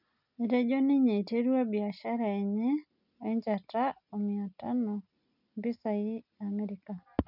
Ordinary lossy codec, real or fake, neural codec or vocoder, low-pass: none; real; none; 5.4 kHz